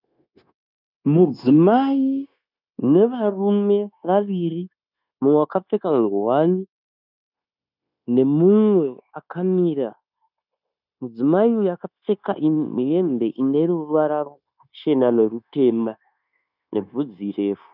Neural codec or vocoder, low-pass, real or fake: codec, 16 kHz, 0.9 kbps, LongCat-Audio-Codec; 5.4 kHz; fake